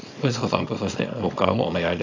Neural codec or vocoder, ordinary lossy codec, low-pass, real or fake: codec, 24 kHz, 0.9 kbps, WavTokenizer, small release; AAC, 48 kbps; 7.2 kHz; fake